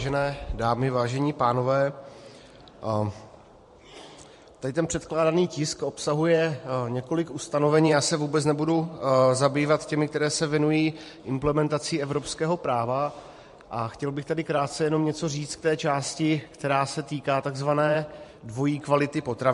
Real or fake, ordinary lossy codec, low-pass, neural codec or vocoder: fake; MP3, 48 kbps; 14.4 kHz; vocoder, 44.1 kHz, 128 mel bands every 512 samples, BigVGAN v2